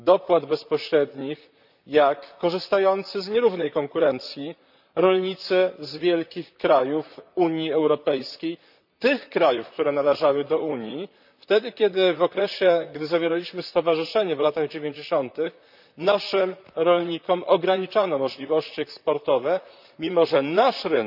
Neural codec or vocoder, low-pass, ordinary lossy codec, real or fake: vocoder, 44.1 kHz, 128 mel bands, Pupu-Vocoder; 5.4 kHz; none; fake